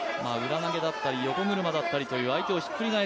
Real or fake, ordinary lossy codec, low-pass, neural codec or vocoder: real; none; none; none